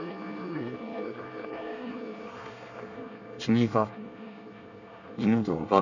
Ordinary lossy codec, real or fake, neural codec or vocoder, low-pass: none; fake; codec, 24 kHz, 1 kbps, SNAC; 7.2 kHz